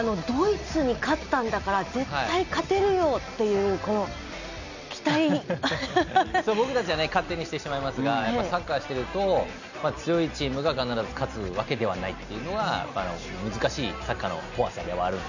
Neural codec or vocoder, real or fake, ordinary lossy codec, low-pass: none; real; none; 7.2 kHz